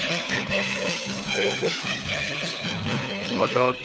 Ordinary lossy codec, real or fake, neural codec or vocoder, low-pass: none; fake; codec, 16 kHz, 4 kbps, FunCodec, trained on LibriTTS, 50 frames a second; none